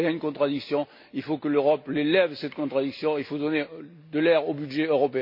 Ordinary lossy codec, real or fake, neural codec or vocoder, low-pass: MP3, 32 kbps; real; none; 5.4 kHz